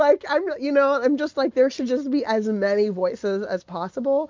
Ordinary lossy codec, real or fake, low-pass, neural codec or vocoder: MP3, 64 kbps; real; 7.2 kHz; none